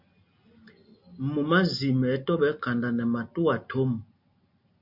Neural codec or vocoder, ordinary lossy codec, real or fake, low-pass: none; MP3, 48 kbps; real; 5.4 kHz